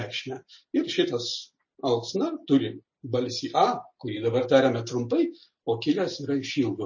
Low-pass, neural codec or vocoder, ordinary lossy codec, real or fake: 7.2 kHz; none; MP3, 32 kbps; real